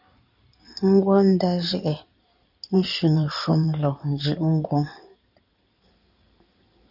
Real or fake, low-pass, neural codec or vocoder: fake; 5.4 kHz; codec, 16 kHz in and 24 kHz out, 2.2 kbps, FireRedTTS-2 codec